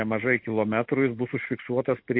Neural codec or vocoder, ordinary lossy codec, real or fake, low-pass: none; MP3, 32 kbps; real; 5.4 kHz